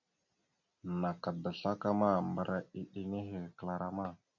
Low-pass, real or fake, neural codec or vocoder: 7.2 kHz; real; none